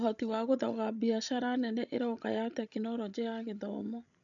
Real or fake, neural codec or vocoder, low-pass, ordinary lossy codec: fake; codec, 16 kHz, 16 kbps, FreqCodec, larger model; 7.2 kHz; none